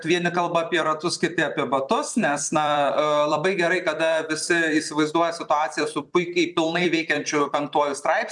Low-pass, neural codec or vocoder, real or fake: 10.8 kHz; vocoder, 44.1 kHz, 128 mel bands every 256 samples, BigVGAN v2; fake